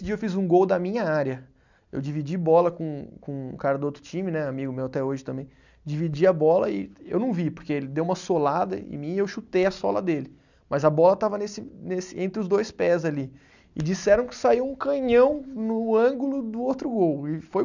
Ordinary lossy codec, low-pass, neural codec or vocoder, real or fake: none; 7.2 kHz; none; real